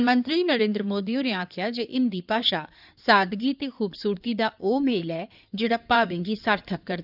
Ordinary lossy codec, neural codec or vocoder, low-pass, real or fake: none; codec, 16 kHz in and 24 kHz out, 2.2 kbps, FireRedTTS-2 codec; 5.4 kHz; fake